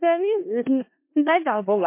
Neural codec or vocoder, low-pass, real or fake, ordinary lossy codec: codec, 16 kHz in and 24 kHz out, 0.4 kbps, LongCat-Audio-Codec, four codebook decoder; 3.6 kHz; fake; MP3, 24 kbps